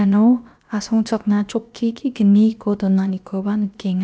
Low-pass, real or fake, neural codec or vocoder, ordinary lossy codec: none; fake; codec, 16 kHz, about 1 kbps, DyCAST, with the encoder's durations; none